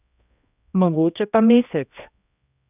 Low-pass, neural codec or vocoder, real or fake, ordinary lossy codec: 3.6 kHz; codec, 16 kHz, 1 kbps, X-Codec, HuBERT features, trained on general audio; fake; none